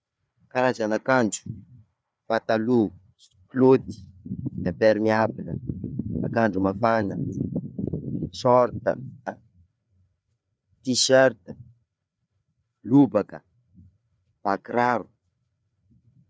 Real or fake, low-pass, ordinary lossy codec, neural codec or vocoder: fake; none; none; codec, 16 kHz, 4 kbps, FreqCodec, larger model